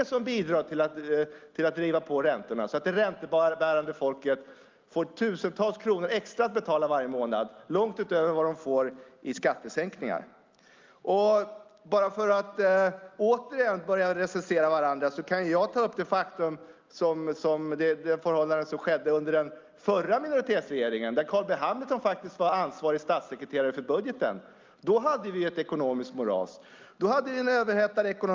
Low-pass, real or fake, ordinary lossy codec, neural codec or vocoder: 7.2 kHz; real; Opus, 24 kbps; none